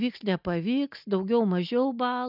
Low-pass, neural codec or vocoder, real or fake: 5.4 kHz; none; real